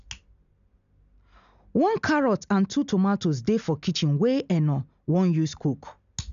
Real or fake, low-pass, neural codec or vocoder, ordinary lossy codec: real; 7.2 kHz; none; none